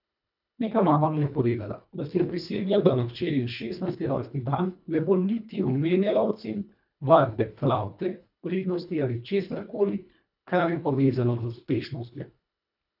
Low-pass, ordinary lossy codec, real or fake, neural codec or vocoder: 5.4 kHz; none; fake; codec, 24 kHz, 1.5 kbps, HILCodec